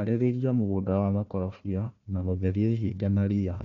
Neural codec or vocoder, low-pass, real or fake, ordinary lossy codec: codec, 16 kHz, 1 kbps, FunCodec, trained on Chinese and English, 50 frames a second; 7.2 kHz; fake; none